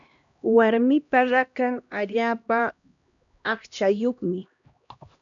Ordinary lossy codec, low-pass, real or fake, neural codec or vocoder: MP3, 96 kbps; 7.2 kHz; fake; codec, 16 kHz, 1 kbps, X-Codec, HuBERT features, trained on LibriSpeech